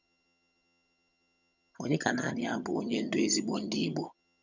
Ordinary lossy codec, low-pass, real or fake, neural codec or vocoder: none; 7.2 kHz; fake; vocoder, 22.05 kHz, 80 mel bands, HiFi-GAN